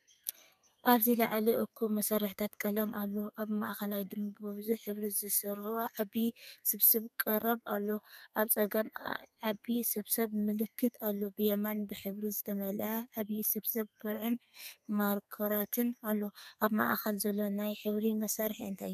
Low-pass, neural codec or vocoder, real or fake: 14.4 kHz; codec, 32 kHz, 1.9 kbps, SNAC; fake